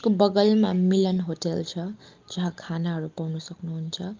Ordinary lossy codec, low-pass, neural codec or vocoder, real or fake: Opus, 32 kbps; 7.2 kHz; none; real